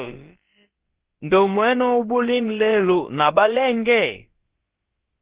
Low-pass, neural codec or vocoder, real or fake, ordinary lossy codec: 3.6 kHz; codec, 16 kHz, about 1 kbps, DyCAST, with the encoder's durations; fake; Opus, 16 kbps